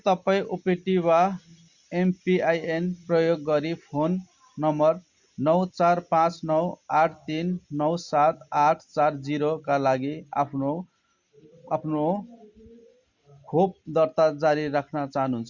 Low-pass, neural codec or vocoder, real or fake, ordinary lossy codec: 7.2 kHz; none; real; Opus, 64 kbps